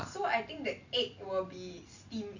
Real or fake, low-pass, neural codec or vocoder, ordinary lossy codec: real; 7.2 kHz; none; none